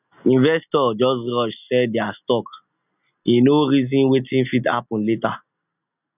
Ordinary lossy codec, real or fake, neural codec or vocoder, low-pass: none; real; none; 3.6 kHz